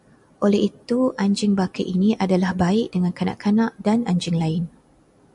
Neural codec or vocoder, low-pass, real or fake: none; 10.8 kHz; real